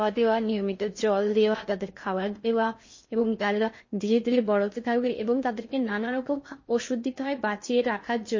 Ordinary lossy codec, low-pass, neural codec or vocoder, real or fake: MP3, 32 kbps; 7.2 kHz; codec, 16 kHz in and 24 kHz out, 0.6 kbps, FocalCodec, streaming, 4096 codes; fake